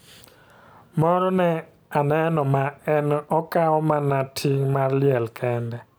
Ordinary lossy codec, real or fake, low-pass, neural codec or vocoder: none; real; none; none